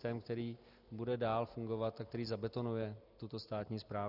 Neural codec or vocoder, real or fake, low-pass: none; real; 5.4 kHz